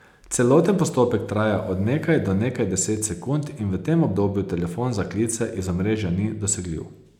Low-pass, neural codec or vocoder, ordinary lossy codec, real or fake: 19.8 kHz; none; none; real